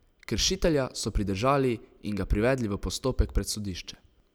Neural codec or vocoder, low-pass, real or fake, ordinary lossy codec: none; none; real; none